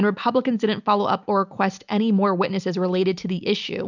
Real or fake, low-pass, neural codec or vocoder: real; 7.2 kHz; none